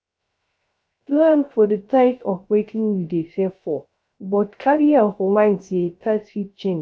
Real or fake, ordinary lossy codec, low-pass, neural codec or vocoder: fake; none; none; codec, 16 kHz, 0.3 kbps, FocalCodec